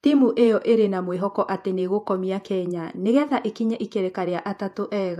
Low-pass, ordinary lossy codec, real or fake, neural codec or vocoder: 14.4 kHz; AAC, 96 kbps; fake; vocoder, 48 kHz, 128 mel bands, Vocos